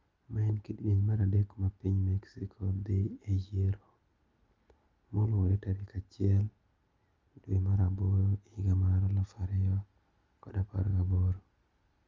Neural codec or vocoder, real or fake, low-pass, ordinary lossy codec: none; real; 7.2 kHz; Opus, 32 kbps